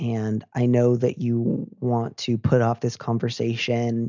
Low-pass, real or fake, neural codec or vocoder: 7.2 kHz; real; none